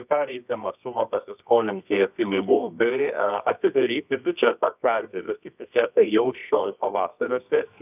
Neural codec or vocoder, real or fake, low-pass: codec, 24 kHz, 0.9 kbps, WavTokenizer, medium music audio release; fake; 3.6 kHz